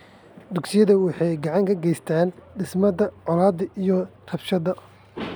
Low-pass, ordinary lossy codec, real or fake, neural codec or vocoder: none; none; real; none